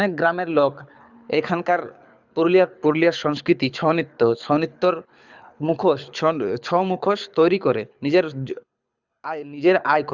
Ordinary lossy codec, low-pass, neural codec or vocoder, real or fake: Opus, 64 kbps; 7.2 kHz; codec, 24 kHz, 6 kbps, HILCodec; fake